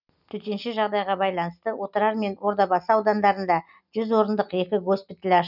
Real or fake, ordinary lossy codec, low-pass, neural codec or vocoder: real; none; 5.4 kHz; none